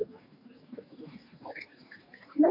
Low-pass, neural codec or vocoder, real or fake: 5.4 kHz; codec, 44.1 kHz, 2.6 kbps, DAC; fake